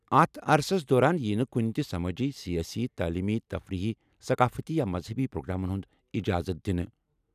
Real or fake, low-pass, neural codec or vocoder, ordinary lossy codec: real; 14.4 kHz; none; none